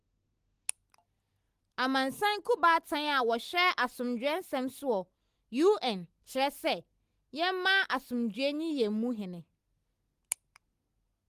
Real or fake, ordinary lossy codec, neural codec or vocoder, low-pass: real; Opus, 24 kbps; none; 14.4 kHz